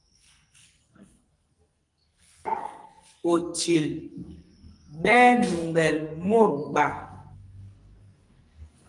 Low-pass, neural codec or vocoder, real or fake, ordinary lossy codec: 10.8 kHz; codec, 44.1 kHz, 2.6 kbps, SNAC; fake; Opus, 32 kbps